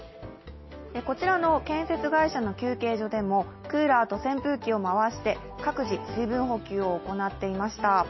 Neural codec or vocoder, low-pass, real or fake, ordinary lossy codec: none; 7.2 kHz; real; MP3, 24 kbps